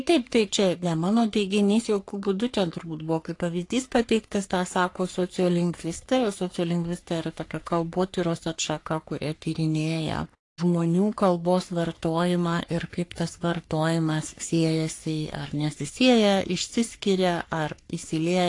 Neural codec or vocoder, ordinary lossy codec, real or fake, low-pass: codec, 44.1 kHz, 3.4 kbps, Pupu-Codec; AAC, 48 kbps; fake; 10.8 kHz